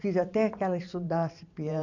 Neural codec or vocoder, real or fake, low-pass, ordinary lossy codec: vocoder, 44.1 kHz, 128 mel bands every 512 samples, BigVGAN v2; fake; 7.2 kHz; none